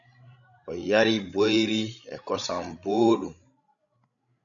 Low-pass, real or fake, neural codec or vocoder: 7.2 kHz; fake; codec, 16 kHz, 16 kbps, FreqCodec, larger model